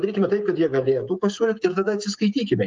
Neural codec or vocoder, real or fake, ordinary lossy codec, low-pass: codec, 16 kHz, 16 kbps, FreqCodec, smaller model; fake; Opus, 32 kbps; 7.2 kHz